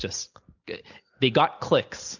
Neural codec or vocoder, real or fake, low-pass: none; real; 7.2 kHz